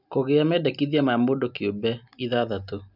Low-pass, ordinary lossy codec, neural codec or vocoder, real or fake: 5.4 kHz; none; none; real